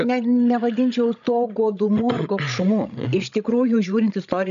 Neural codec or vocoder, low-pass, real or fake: codec, 16 kHz, 16 kbps, FreqCodec, larger model; 7.2 kHz; fake